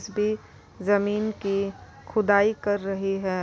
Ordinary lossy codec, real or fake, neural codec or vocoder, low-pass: none; real; none; none